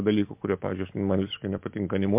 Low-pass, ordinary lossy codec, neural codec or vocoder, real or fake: 3.6 kHz; MP3, 32 kbps; none; real